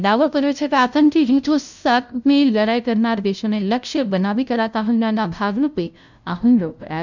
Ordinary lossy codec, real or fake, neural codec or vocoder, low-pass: none; fake; codec, 16 kHz, 0.5 kbps, FunCodec, trained on LibriTTS, 25 frames a second; 7.2 kHz